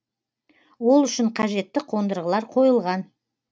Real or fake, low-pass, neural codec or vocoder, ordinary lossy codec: real; none; none; none